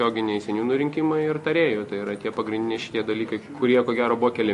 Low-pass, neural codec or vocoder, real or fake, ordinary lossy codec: 14.4 kHz; none; real; MP3, 48 kbps